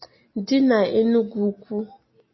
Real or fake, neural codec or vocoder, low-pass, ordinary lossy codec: real; none; 7.2 kHz; MP3, 24 kbps